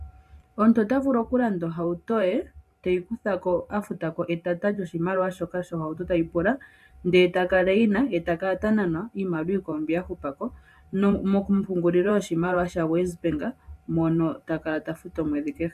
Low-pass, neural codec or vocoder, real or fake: 14.4 kHz; vocoder, 44.1 kHz, 128 mel bands every 512 samples, BigVGAN v2; fake